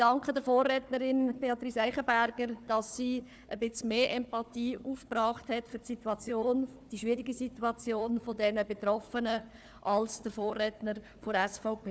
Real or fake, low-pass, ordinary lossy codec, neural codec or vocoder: fake; none; none; codec, 16 kHz, 4 kbps, FunCodec, trained on Chinese and English, 50 frames a second